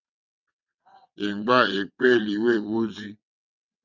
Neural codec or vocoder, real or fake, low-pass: vocoder, 22.05 kHz, 80 mel bands, WaveNeXt; fake; 7.2 kHz